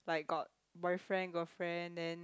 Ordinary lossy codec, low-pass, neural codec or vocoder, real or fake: none; none; none; real